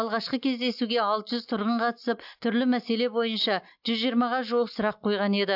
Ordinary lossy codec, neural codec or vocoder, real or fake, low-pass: AAC, 48 kbps; none; real; 5.4 kHz